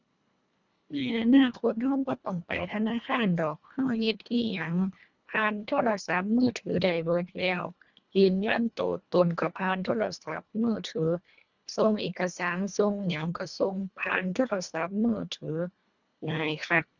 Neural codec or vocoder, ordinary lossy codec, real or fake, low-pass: codec, 24 kHz, 1.5 kbps, HILCodec; none; fake; 7.2 kHz